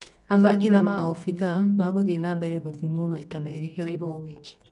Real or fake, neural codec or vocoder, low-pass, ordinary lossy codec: fake; codec, 24 kHz, 0.9 kbps, WavTokenizer, medium music audio release; 10.8 kHz; none